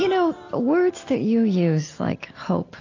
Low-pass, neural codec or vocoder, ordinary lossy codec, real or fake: 7.2 kHz; none; AAC, 32 kbps; real